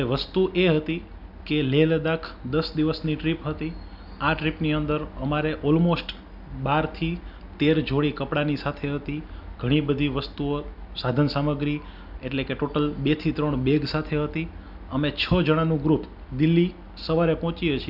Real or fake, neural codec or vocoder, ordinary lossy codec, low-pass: real; none; none; 5.4 kHz